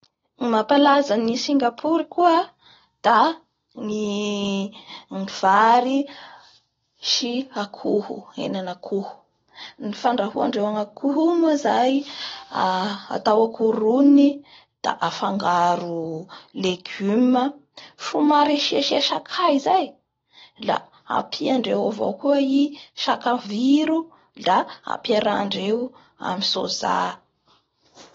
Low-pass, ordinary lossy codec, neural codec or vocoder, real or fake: 7.2 kHz; AAC, 24 kbps; none; real